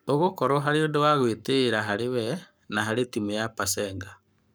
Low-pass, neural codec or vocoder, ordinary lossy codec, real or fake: none; codec, 44.1 kHz, 7.8 kbps, Pupu-Codec; none; fake